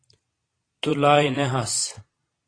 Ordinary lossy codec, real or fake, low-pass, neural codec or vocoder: AAC, 32 kbps; fake; 9.9 kHz; vocoder, 22.05 kHz, 80 mel bands, Vocos